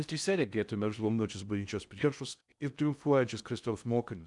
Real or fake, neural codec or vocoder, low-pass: fake; codec, 16 kHz in and 24 kHz out, 0.6 kbps, FocalCodec, streaming, 4096 codes; 10.8 kHz